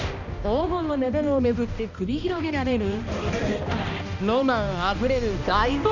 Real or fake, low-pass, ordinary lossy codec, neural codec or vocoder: fake; 7.2 kHz; none; codec, 16 kHz, 1 kbps, X-Codec, HuBERT features, trained on balanced general audio